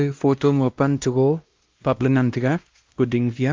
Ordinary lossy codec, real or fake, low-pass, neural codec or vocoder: Opus, 24 kbps; fake; 7.2 kHz; codec, 16 kHz, 1 kbps, X-Codec, WavLM features, trained on Multilingual LibriSpeech